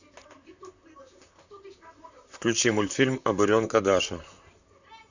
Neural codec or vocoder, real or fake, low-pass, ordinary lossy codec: vocoder, 44.1 kHz, 128 mel bands, Pupu-Vocoder; fake; 7.2 kHz; none